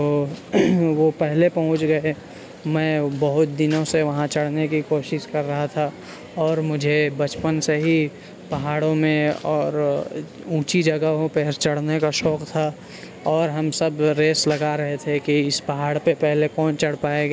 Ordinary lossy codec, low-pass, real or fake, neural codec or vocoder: none; none; real; none